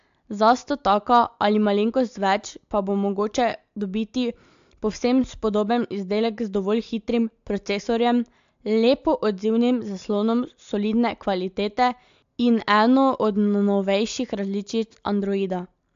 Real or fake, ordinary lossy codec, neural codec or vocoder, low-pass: real; AAC, 64 kbps; none; 7.2 kHz